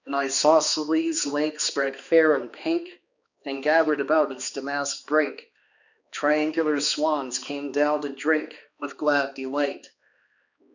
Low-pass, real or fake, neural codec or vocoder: 7.2 kHz; fake; codec, 16 kHz, 2 kbps, X-Codec, HuBERT features, trained on balanced general audio